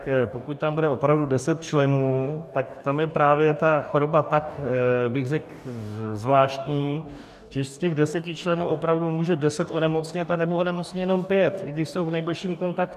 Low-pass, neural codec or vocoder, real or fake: 14.4 kHz; codec, 44.1 kHz, 2.6 kbps, DAC; fake